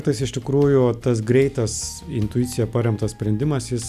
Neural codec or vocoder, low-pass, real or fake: none; 14.4 kHz; real